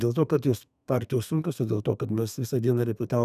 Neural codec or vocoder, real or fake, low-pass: codec, 32 kHz, 1.9 kbps, SNAC; fake; 14.4 kHz